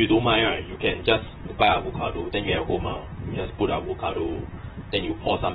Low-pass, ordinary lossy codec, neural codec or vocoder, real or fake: 19.8 kHz; AAC, 16 kbps; vocoder, 44.1 kHz, 128 mel bands, Pupu-Vocoder; fake